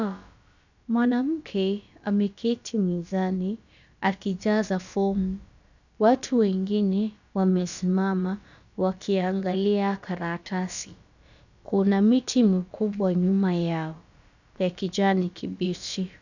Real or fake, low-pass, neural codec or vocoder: fake; 7.2 kHz; codec, 16 kHz, about 1 kbps, DyCAST, with the encoder's durations